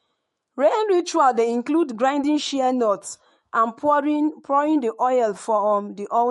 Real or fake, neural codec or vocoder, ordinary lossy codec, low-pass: fake; autoencoder, 48 kHz, 128 numbers a frame, DAC-VAE, trained on Japanese speech; MP3, 48 kbps; 19.8 kHz